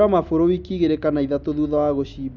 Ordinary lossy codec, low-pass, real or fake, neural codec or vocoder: none; 7.2 kHz; real; none